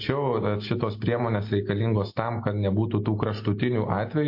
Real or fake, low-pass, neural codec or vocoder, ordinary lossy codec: real; 5.4 kHz; none; MP3, 32 kbps